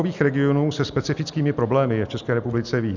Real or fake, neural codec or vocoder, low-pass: real; none; 7.2 kHz